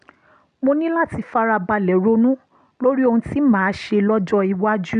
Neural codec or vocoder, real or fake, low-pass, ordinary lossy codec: none; real; 9.9 kHz; none